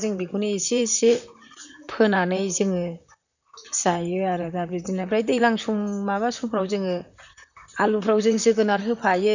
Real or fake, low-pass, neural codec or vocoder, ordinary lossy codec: fake; 7.2 kHz; vocoder, 44.1 kHz, 128 mel bands, Pupu-Vocoder; none